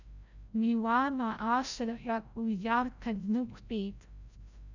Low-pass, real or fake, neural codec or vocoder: 7.2 kHz; fake; codec, 16 kHz, 0.5 kbps, FreqCodec, larger model